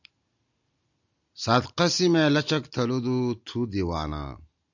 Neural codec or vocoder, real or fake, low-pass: none; real; 7.2 kHz